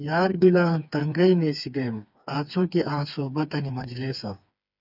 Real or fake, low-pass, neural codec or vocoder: fake; 5.4 kHz; codec, 16 kHz, 4 kbps, FreqCodec, smaller model